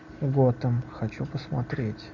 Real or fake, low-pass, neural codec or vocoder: real; 7.2 kHz; none